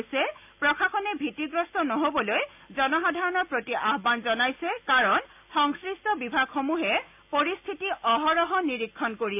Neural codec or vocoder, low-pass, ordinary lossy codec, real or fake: none; 3.6 kHz; AAC, 32 kbps; real